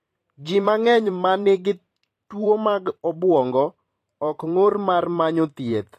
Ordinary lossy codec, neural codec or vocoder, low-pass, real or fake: AAC, 48 kbps; vocoder, 44.1 kHz, 128 mel bands every 512 samples, BigVGAN v2; 14.4 kHz; fake